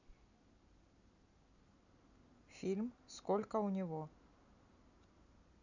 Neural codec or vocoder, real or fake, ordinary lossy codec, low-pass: none; real; none; 7.2 kHz